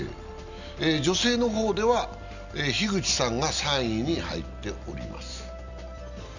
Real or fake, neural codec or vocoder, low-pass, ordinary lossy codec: real; none; 7.2 kHz; none